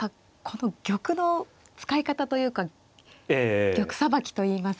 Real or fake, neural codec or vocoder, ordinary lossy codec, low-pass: real; none; none; none